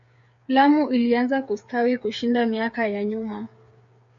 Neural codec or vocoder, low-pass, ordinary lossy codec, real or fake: codec, 16 kHz, 8 kbps, FreqCodec, smaller model; 7.2 kHz; MP3, 48 kbps; fake